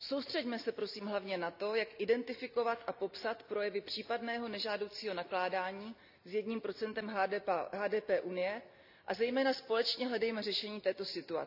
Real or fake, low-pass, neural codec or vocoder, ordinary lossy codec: real; 5.4 kHz; none; none